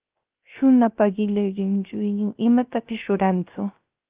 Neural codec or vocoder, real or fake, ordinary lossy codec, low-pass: codec, 16 kHz, 0.3 kbps, FocalCodec; fake; Opus, 32 kbps; 3.6 kHz